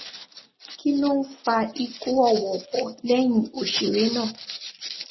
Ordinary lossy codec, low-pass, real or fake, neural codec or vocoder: MP3, 24 kbps; 7.2 kHz; real; none